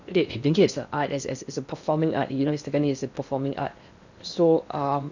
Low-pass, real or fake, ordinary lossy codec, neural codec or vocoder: 7.2 kHz; fake; none; codec, 16 kHz in and 24 kHz out, 0.8 kbps, FocalCodec, streaming, 65536 codes